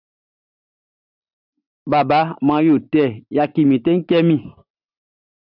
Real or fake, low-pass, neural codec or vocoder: real; 5.4 kHz; none